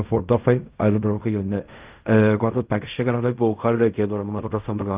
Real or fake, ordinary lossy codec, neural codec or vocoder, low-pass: fake; Opus, 24 kbps; codec, 16 kHz in and 24 kHz out, 0.4 kbps, LongCat-Audio-Codec, fine tuned four codebook decoder; 3.6 kHz